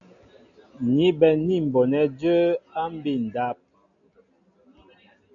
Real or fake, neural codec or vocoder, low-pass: real; none; 7.2 kHz